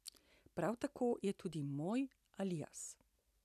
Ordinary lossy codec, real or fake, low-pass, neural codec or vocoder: none; real; 14.4 kHz; none